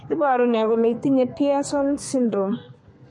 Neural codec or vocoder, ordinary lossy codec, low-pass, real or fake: codec, 32 kHz, 1.9 kbps, SNAC; MP3, 48 kbps; 10.8 kHz; fake